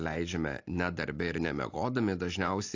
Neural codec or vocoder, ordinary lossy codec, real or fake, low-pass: none; AAC, 48 kbps; real; 7.2 kHz